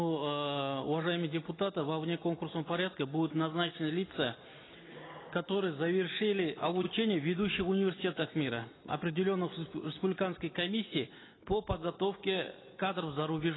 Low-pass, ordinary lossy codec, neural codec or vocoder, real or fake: 7.2 kHz; AAC, 16 kbps; none; real